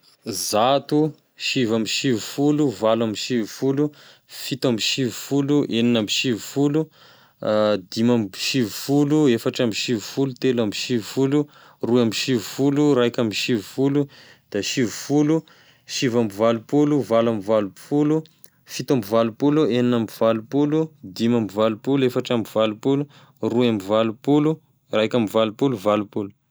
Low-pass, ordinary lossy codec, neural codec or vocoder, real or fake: none; none; none; real